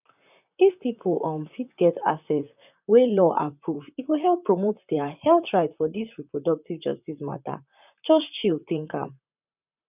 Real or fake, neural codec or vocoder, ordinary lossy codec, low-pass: fake; vocoder, 44.1 kHz, 128 mel bands, Pupu-Vocoder; none; 3.6 kHz